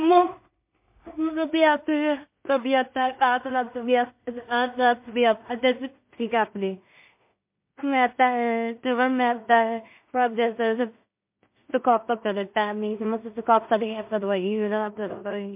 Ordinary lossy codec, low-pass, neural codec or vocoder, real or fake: MP3, 32 kbps; 3.6 kHz; codec, 16 kHz in and 24 kHz out, 0.4 kbps, LongCat-Audio-Codec, two codebook decoder; fake